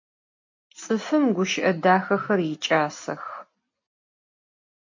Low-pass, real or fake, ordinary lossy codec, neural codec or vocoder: 7.2 kHz; real; MP3, 48 kbps; none